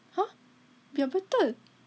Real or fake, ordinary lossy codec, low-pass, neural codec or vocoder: real; none; none; none